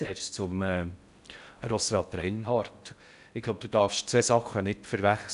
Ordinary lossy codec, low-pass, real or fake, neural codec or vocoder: none; 10.8 kHz; fake; codec, 16 kHz in and 24 kHz out, 0.6 kbps, FocalCodec, streaming, 4096 codes